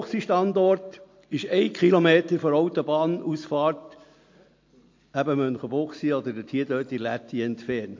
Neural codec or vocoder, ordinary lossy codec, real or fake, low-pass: none; MP3, 48 kbps; real; 7.2 kHz